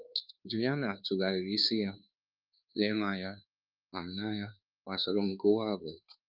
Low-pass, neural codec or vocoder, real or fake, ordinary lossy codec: 5.4 kHz; codec, 24 kHz, 1.2 kbps, DualCodec; fake; Opus, 24 kbps